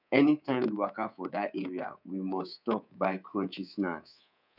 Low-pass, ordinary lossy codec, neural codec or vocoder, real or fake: 5.4 kHz; none; codec, 24 kHz, 3.1 kbps, DualCodec; fake